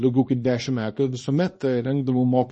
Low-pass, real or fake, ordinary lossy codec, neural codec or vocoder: 10.8 kHz; fake; MP3, 32 kbps; codec, 24 kHz, 0.9 kbps, WavTokenizer, small release